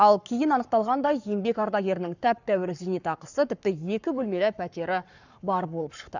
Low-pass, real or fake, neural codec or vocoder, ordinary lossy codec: 7.2 kHz; fake; codec, 44.1 kHz, 7.8 kbps, Pupu-Codec; none